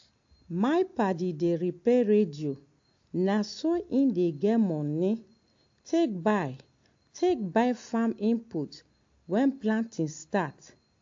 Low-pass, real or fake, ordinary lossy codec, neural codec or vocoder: 7.2 kHz; real; AAC, 48 kbps; none